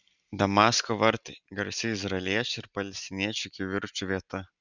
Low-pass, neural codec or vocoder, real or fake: 7.2 kHz; none; real